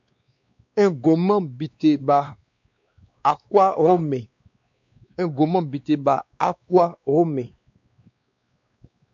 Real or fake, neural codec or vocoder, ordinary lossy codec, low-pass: fake; codec, 16 kHz, 2 kbps, X-Codec, WavLM features, trained on Multilingual LibriSpeech; MP3, 64 kbps; 7.2 kHz